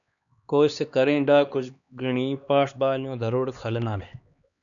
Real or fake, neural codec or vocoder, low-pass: fake; codec, 16 kHz, 2 kbps, X-Codec, HuBERT features, trained on LibriSpeech; 7.2 kHz